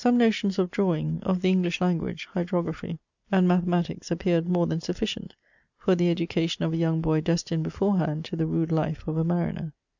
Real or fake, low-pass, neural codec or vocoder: real; 7.2 kHz; none